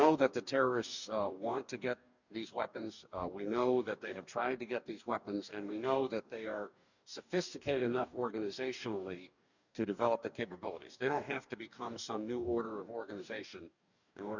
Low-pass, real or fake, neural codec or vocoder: 7.2 kHz; fake; codec, 44.1 kHz, 2.6 kbps, DAC